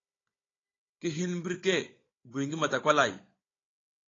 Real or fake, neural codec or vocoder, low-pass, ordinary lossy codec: fake; codec, 16 kHz, 16 kbps, FunCodec, trained on Chinese and English, 50 frames a second; 7.2 kHz; AAC, 32 kbps